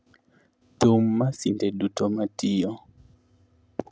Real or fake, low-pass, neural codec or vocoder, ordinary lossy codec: real; none; none; none